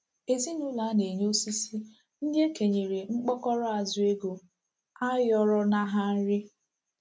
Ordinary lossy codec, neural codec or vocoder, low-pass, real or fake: none; none; none; real